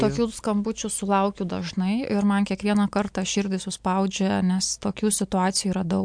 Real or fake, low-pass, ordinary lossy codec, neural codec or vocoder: real; 9.9 kHz; MP3, 64 kbps; none